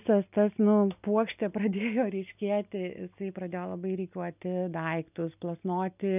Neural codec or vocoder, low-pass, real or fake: none; 3.6 kHz; real